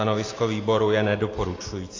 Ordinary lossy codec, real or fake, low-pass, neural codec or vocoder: AAC, 32 kbps; real; 7.2 kHz; none